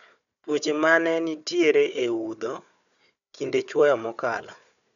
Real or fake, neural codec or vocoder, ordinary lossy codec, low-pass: fake; codec, 16 kHz, 4 kbps, FunCodec, trained on Chinese and English, 50 frames a second; none; 7.2 kHz